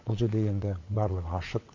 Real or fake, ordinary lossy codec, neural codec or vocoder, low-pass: fake; MP3, 48 kbps; vocoder, 22.05 kHz, 80 mel bands, WaveNeXt; 7.2 kHz